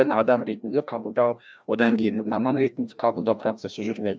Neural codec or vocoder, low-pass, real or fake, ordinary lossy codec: codec, 16 kHz, 1 kbps, FreqCodec, larger model; none; fake; none